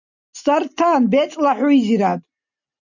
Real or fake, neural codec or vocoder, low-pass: real; none; 7.2 kHz